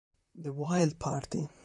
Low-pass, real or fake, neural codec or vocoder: 10.8 kHz; fake; vocoder, 44.1 kHz, 128 mel bands, Pupu-Vocoder